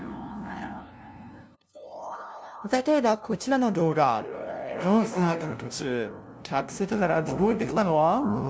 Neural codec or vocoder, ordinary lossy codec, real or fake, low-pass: codec, 16 kHz, 0.5 kbps, FunCodec, trained on LibriTTS, 25 frames a second; none; fake; none